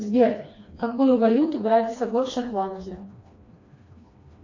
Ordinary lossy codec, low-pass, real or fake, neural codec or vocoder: AAC, 48 kbps; 7.2 kHz; fake; codec, 16 kHz, 2 kbps, FreqCodec, smaller model